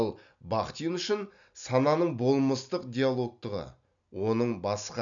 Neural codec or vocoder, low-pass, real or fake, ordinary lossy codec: none; 7.2 kHz; real; none